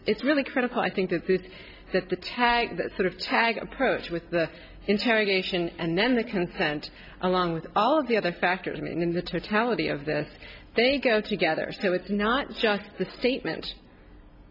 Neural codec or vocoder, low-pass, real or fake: none; 5.4 kHz; real